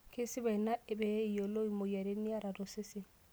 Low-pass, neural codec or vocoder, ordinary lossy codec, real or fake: none; none; none; real